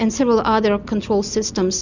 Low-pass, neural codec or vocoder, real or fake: 7.2 kHz; none; real